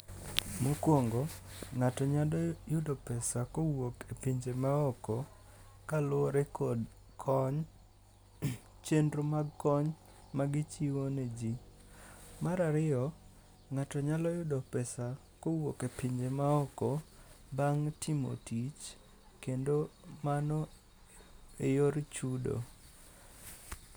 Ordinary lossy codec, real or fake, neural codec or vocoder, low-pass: none; real; none; none